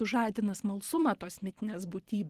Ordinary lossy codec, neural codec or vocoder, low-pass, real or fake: Opus, 16 kbps; vocoder, 44.1 kHz, 128 mel bands every 512 samples, BigVGAN v2; 14.4 kHz; fake